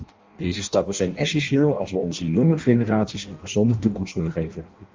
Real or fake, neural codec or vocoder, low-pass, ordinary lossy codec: fake; codec, 16 kHz in and 24 kHz out, 0.6 kbps, FireRedTTS-2 codec; 7.2 kHz; Opus, 32 kbps